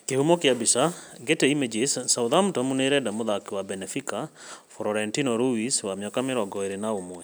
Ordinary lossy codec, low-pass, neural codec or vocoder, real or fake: none; none; none; real